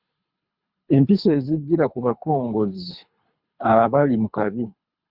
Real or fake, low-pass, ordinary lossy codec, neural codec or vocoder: fake; 5.4 kHz; Opus, 64 kbps; codec, 24 kHz, 3 kbps, HILCodec